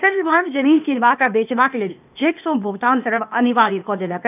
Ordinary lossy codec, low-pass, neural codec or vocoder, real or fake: none; 3.6 kHz; codec, 16 kHz, 0.8 kbps, ZipCodec; fake